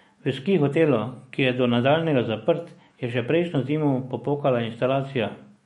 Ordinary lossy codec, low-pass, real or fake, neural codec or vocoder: MP3, 48 kbps; 19.8 kHz; fake; autoencoder, 48 kHz, 128 numbers a frame, DAC-VAE, trained on Japanese speech